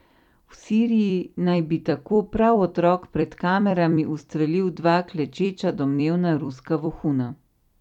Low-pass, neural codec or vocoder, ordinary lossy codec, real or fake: 19.8 kHz; vocoder, 44.1 kHz, 128 mel bands every 256 samples, BigVGAN v2; none; fake